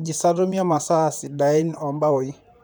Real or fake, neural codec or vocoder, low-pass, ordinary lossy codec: fake; vocoder, 44.1 kHz, 128 mel bands, Pupu-Vocoder; none; none